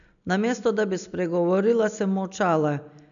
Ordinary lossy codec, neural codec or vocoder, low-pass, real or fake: none; none; 7.2 kHz; real